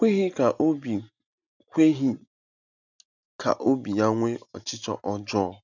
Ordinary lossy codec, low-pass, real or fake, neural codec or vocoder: none; 7.2 kHz; real; none